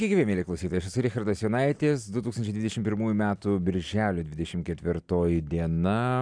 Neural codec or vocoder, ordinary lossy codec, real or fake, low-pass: none; MP3, 96 kbps; real; 9.9 kHz